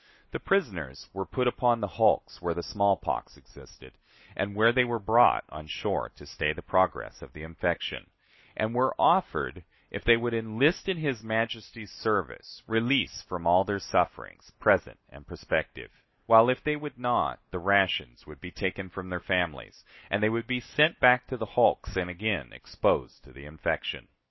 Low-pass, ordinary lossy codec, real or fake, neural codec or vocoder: 7.2 kHz; MP3, 24 kbps; real; none